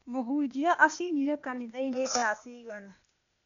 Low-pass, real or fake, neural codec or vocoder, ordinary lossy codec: 7.2 kHz; fake; codec, 16 kHz, 0.8 kbps, ZipCodec; none